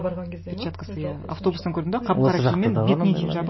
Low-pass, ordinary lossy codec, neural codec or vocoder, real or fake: 7.2 kHz; MP3, 24 kbps; none; real